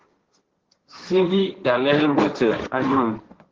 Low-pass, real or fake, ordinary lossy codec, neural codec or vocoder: 7.2 kHz; fake; Opus, 16 kbps; codec, 16 kHz, 1.1 kbps, Voila-Tokenizer